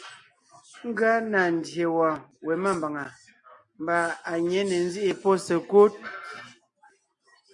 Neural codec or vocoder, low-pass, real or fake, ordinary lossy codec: none; 10.8 kHz; real; MP3, 48 kbps